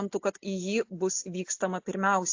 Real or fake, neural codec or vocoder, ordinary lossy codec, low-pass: real; none; AAC, 48 kbps; 7.2 kHz